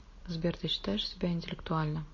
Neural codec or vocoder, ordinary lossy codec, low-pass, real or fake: none; MP3, 32 kbps; 7.2 kHz; real